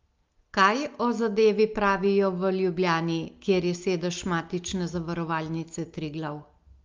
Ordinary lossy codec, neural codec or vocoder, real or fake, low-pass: Opus, 32 kbps; none; real; 7.2 kHz